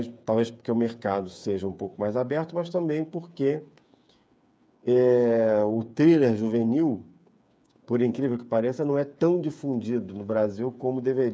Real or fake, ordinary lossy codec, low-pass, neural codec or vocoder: fake; none; none; codec, 16 kHz, 8 kbps, FreqCodec, smaller model